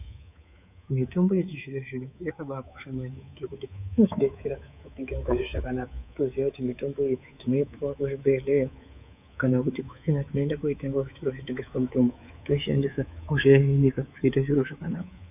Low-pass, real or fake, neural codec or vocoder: 3.6 kHz; fake; codec, 24 kHz, 3.1 kbps, DualCodec